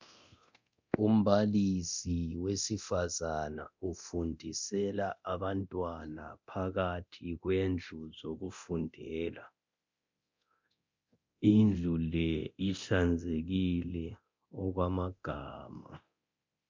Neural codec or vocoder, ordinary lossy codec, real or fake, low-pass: codec, 24 kHz, 0.9 kbps, DualCodec; MP3, 64 kbps; fake; 7.2 kHz